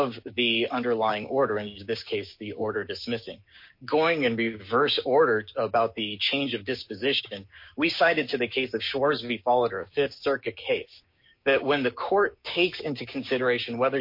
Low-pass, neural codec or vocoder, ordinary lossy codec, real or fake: 5.4 kHz; codec, 16 kHz, 6 kbps, DAC; MP3, 32 kbps; fake